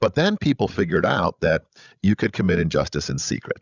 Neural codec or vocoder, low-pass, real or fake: codec, 16 kHz, 16 kbps, FreqCodec, larger model; 7.2 kHz; fake